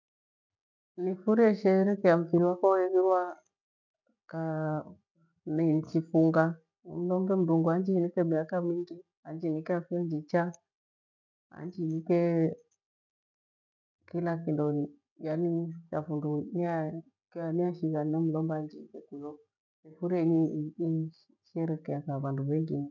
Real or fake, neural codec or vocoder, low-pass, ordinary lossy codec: real; none; 7.2 kHz; none